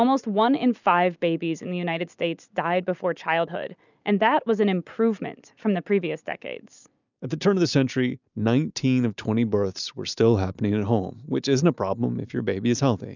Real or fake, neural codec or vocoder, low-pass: real; none; 7.2 kHz